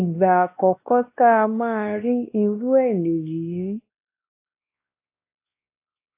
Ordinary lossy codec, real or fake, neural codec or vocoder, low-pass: AAC, 24 kbps; fake; codec, 16 kHz, 1 kbps, X-Codec, WavLM features, trained on Multilingual LibriSpeech; 3.6 kHz